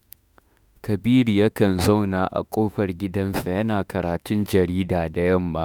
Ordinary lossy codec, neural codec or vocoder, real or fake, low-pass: none; autoencoder, 48 kHz, 32 numbers a frame, DAC-VAE, trained on Japanese speech; fake; none